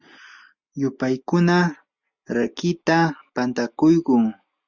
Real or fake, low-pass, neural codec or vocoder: real; 7.2 kHz; none